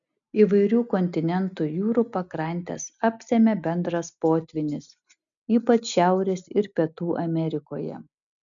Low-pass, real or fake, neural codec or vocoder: 7.2 kHz; real; none